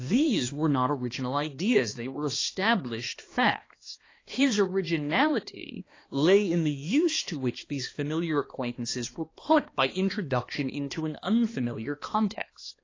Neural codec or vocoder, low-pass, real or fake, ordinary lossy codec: codec, 16 kHz, 2 kbps, X-Codec, HuBERT features, trained on balanced general audio; 7.2 kHz; fake; AAC, 32 kbps